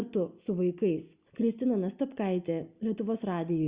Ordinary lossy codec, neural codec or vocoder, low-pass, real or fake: Opus, 64 kbps; none; 3.6 kHz; real